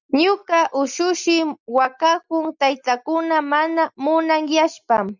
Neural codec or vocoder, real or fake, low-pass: none; real; 7.2 kHz